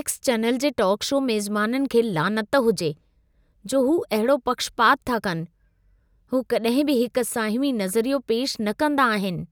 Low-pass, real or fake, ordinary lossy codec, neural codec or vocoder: none; real; none; none